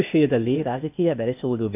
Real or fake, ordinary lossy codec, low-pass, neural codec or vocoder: fake; none; 3.6 kHz; codec, 16 kHz, 0.8 kbps, ZipCodec